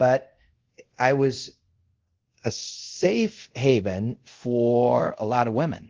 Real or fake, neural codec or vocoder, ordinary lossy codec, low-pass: fake; codec, 24 kHz, 0.5 kbps, DualCodec; Opus, 16 kbps; 7.2 kHz